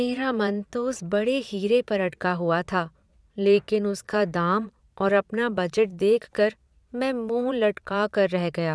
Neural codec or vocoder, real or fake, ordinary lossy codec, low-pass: vocoder, 22.05 kHz, 80 mel bands, Vocos; fake; none; none